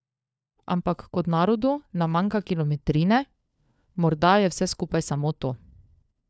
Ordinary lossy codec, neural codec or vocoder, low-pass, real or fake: none; codec, 16 kHz, 4 kbps, FunCodec, trained on LibriTTS, 50 frames a second; none; fake